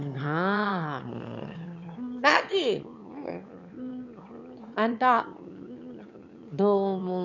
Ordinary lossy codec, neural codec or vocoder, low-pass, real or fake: none; autoencoder, 22.05 kHz, a latent of 192 numbers a frame, VITS, trained on one speaker; 7.2 kHz; fake